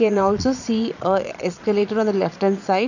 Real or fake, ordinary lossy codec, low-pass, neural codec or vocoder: fake; none; 7.2 kHz; vocoder, 22.05 kHz, 80 mel bands, Vocos